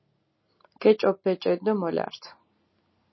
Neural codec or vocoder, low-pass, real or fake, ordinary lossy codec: none; 7.2 kHz; real; MP3, 24 kbps